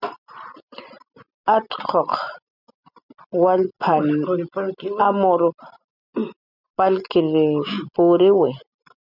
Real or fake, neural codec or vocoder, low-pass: real; none; 5.4 kHz